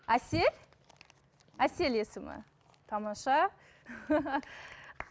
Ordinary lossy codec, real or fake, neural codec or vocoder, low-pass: none; real; none; none